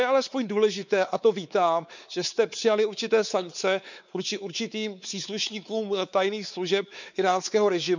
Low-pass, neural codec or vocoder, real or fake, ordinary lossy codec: 7.2 kHz; codec, 16 kHz, 4 kbps, X-Codec, WavLM features, trained on Multilingual LibriSpeech; fake; none